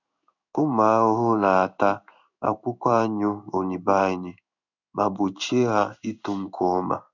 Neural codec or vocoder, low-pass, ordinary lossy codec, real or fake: codec, 16 kHz in and 24 kHz out, 1 kbps, XY-Tokenizer; 7.2 kHz; none; fake